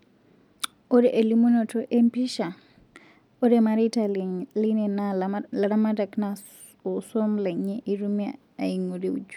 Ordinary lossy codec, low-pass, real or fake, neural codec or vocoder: none; 19.8 kHz; real; none